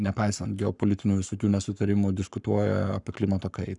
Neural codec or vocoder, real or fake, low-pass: codec, 44.1 kHz, 7.8 kbps, Pupu-Codec; fake; 10.8 kHz